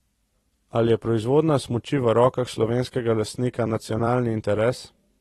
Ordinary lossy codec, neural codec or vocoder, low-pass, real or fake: AAC, 32 kbps; vocoder, 44.1 kHz, 128 mel bands every 256 samples, BigVGAN v2; 19.8 kHz; fake